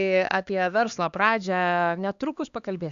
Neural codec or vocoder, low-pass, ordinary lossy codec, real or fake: codec, 16 kHz, 2 kbps, X-Codec, HuBERT features, trained on LibriSpeech; 7.2 kHz; Opus, 64 kbps; fake